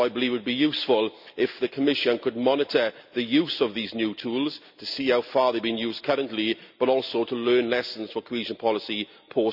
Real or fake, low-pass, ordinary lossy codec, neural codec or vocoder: real; 5.4 kHz; none; none